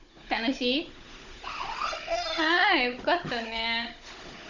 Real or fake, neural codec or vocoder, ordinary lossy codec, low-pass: fake; codec, 16 kHz, 16 kbps, FunCodec, trained on Chinese and English, 50 frames a second; none; 7.2 kHz